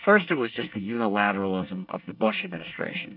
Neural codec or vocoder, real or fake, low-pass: codec, 24 kHz, 1 kbps, SNAC; fake; 5.4 kHz